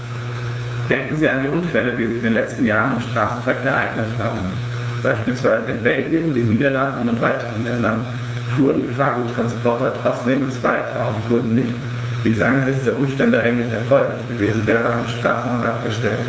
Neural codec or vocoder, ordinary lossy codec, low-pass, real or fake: codec, 16 kHz, 2 kbps, FunCodec, trained on LibriTTS, 25 frames a second; none; none; fake